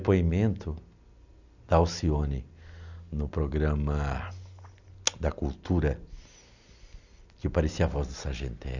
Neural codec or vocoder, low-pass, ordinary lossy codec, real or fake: none; 7.2 kHz; none; real